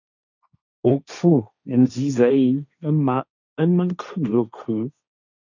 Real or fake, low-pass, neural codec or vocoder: fake; 7.2 kHz; codec, 16 kHz, 1.1 kbps, Voila-Tokenizer